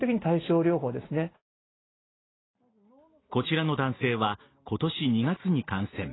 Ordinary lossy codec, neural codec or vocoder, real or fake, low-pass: AAC, 16 kbps; none; real; 7.2 kHz